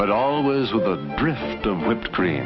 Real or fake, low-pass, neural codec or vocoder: real; 7.2 kHz; none